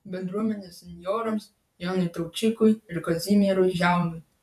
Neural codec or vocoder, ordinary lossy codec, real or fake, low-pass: vocoder, 48 kHz, 128 mel bands, Vocos; MP3, 96 kbps; fake; 14.4 kHz